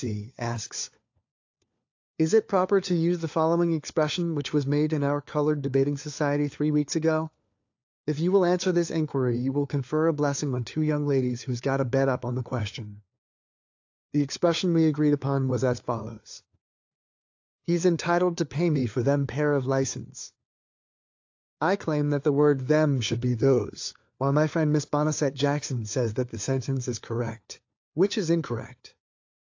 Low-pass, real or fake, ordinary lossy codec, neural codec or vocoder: 7.2 kHz; fake; AAC, 48 kbps; codec, 16 kHz, 4 kbps, FunCodec, trained on LibriTTS, 50 frames a second